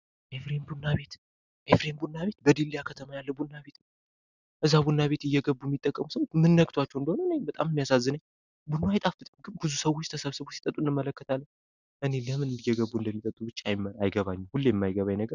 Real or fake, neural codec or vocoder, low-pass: real; none; 7.2 kHz